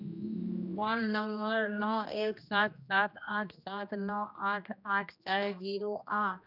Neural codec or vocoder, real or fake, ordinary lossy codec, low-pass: codec, 16 kHz, 1 kbps, X-Codec, HuBERT features, trained on general audio; fake; none; 5.4 kHz